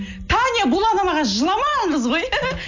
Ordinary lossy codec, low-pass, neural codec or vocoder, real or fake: none; 7.2 kHz; none; real